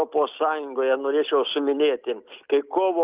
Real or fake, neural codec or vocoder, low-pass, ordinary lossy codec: real; none; 3.6 kHz; Opus, 24 kbps